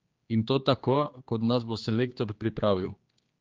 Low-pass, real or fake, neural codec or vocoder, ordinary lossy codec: 7.2 kHz; fake; codec, 16 kHz, 2 kbps, X-Codec, HuBERT features, trained on general audio; Opus, 32 kbps